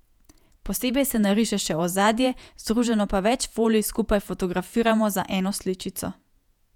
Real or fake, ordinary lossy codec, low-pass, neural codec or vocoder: fake; none; 19.8 kHz; vocoder, 48 kHz, 128 mel bands, Vocos